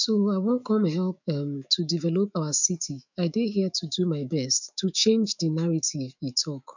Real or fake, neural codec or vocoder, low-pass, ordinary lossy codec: fake; autoencoder, 48 kHz, 128 numbers a frame, DAC-VAE, trained on Japanese speech; 7.2 kHz; none